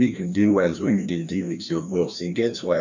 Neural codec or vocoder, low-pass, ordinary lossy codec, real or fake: codec, 16 kHz, 1 kbps, FreqCodec, larger model; 7.2 kHz; none; fake